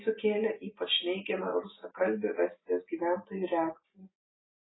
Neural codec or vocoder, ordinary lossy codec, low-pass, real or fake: none; AAC, 16 kbps; 7.2 kHz; real